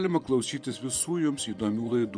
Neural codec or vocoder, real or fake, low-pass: vocoder, 24 kHz, 100 mel bands, Vocos; fake; 9.9 kHz